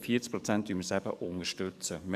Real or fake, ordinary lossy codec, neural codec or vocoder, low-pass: fake; none; codec, 44.1 kHz, 7.8 kbps, DAC; 14.4 kHz